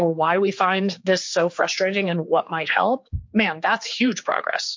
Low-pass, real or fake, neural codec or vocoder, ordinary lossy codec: 7.2 kHz; fake; codec, 16 kHz, 4 kbps, X-Codec, HuBERT features, trained on general audio; MP3, 48 kbps